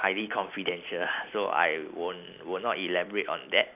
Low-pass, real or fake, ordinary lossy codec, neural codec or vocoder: 3.6 kHz; real; none; none